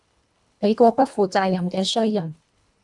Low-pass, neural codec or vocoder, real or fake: 10.8 kHz; codec, 24 kHz, 1.5 kbps, HILCodec; fake